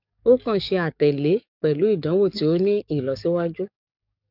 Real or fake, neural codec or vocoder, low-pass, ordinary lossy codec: fake; codec, 44.1 kHz, 7.8 kbps, DAC; 5.4 kHz; none